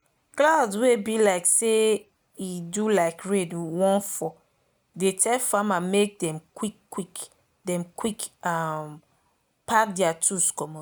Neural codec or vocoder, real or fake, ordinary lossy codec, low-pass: none; real; none; none